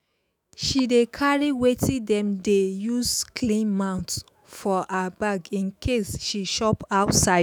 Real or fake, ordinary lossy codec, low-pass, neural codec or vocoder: fake; none; none; autoencoder, 48 kHz, 128 numbers a frame, DAC-VAE, trained on Japanese speech